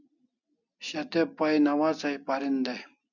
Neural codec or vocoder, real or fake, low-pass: none; real; 7.2 kHz